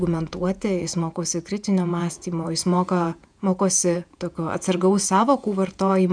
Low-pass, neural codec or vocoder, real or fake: 9.9 kHz; vocoder, 48 kHz, 128 mel bands, Vocos; fake